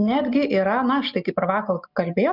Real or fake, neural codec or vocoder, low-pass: real; none; 5.4 kHz